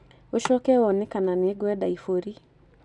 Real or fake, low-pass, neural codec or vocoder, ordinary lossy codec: real; 10.8 kHz; none; none